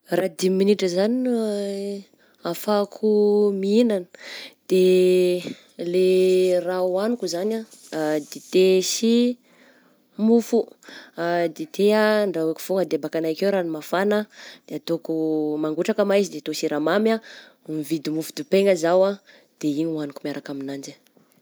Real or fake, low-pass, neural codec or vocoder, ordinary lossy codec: real; none; none; none